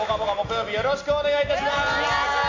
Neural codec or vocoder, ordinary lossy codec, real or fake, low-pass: none; MP3, 64 kbps; real; 7.2 kHz